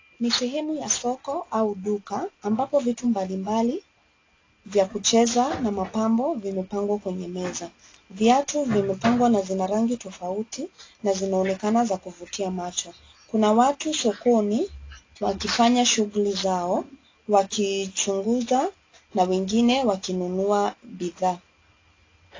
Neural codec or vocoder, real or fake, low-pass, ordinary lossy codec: none; real; 7.2 kHz; AAC, 32 kbps